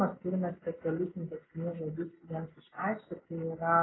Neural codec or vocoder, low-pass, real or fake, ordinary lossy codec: none; 7.2 kHz; real; AAC, 16 kbps